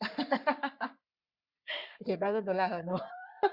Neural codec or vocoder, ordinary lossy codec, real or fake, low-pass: codec, 44.1 kHz, 3.4 kbps, Pupu-Codec; Opus, 64 kbps; fake; 5.4 kHz